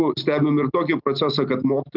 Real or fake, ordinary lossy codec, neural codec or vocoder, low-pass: real; Opus, 24 kbps; none; 5.4 kHz